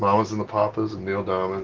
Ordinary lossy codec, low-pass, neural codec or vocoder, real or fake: Opus, 16 kbps; 7.2 kHz; none; real